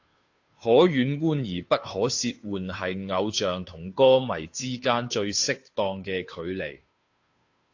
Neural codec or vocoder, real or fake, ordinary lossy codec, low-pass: codec, 16 kHz, 2 kbps, FunCodec, trained on Chinese and English, 25 frames a second; fake; AAC, 48 kbps; 7.2 kHz